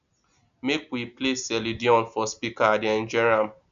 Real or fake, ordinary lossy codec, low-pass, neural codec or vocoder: real; none; 7.2 kHz; none